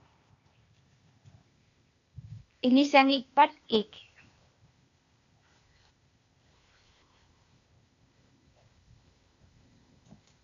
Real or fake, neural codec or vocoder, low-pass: fake; codec, 16 kHz, 0.8 kbps, ZipCodec; 7.2 kHz